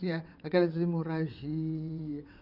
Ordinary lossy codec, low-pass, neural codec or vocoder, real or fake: none; 5.4 kHz; vocoder, 22.05 kHz, 80 mel bands, WaveNeXt; fake